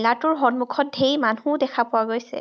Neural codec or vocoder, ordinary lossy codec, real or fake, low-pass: none; none; real; none